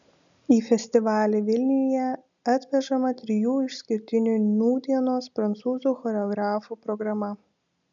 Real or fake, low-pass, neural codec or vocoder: real; 7.2 kHz; none